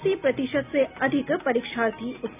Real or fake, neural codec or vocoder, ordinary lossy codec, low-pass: real; none; none; 3.6 kHz